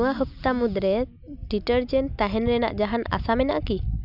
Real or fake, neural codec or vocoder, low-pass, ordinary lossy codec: real; none; 5.4 kHz; none